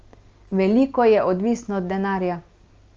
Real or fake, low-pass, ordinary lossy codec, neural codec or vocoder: real; 7.2 kHz; Opus, 24 kbps; none